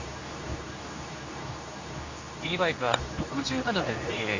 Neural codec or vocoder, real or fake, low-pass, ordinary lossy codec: codec, 24 kHz, 0.9 kbps, WavTokenizer, medium speech release version 2; fake; 7.2 kHz; AAC, 48 kbps